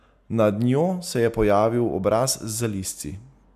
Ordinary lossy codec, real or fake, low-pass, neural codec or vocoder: none; real; 14.4 kHz; none